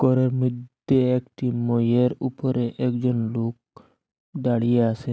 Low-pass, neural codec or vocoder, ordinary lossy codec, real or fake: none; none; none; real